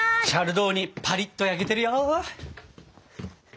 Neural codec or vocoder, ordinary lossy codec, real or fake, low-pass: none; none; real; none